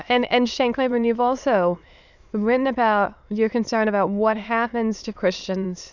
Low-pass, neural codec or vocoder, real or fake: 7.2 kHz; autoencoder, 22.05 kHz, a latent of 192 numbers a frame, VITS, trained on many speakers; fake